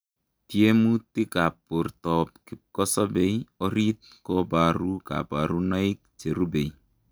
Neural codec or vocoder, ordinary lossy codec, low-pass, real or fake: none; none; none; real